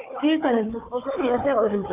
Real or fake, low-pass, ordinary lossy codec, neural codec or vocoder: fake; 3.6 kHz; AAC, 24 kbps; codec, 16 kHz, 4 kbps, FunCodec, trained on Chinese and English, 50 frames a second